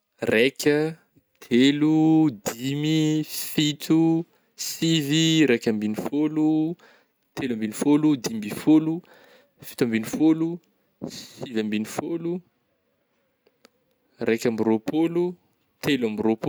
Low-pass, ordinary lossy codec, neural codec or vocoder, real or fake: none; none; none; real